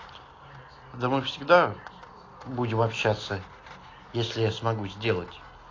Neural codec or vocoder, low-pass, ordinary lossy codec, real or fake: none; 7.2 kHz; AAC, 32 kbps; real